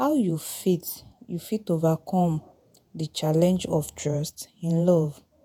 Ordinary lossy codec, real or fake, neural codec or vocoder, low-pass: none; fake; vocoder, 48 kHz, 128 mel bands, Vocos; none